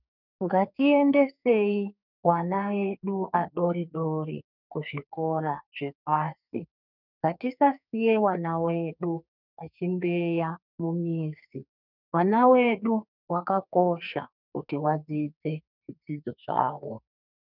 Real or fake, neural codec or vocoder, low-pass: fake; codec, 44.1 kHz, 2.6 kbps, SNAC; 5.4 kHz